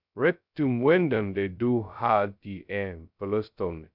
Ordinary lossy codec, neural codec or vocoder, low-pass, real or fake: none; codec, 16 kHz, 0.2 kbps, FocalCodec; 5.4 kHz; fake